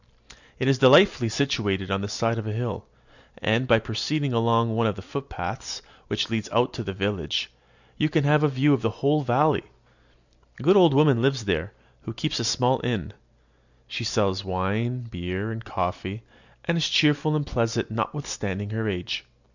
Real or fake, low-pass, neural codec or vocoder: real; 7.2 kHz; none